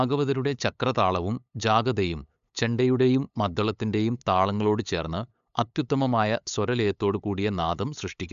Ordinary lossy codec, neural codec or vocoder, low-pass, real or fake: AAC, 96 kbps; codec, 16 kHz, 8 kbps, FunCodec, trained on LibriTTS, 25 frames a second; 7.2 kHz; fake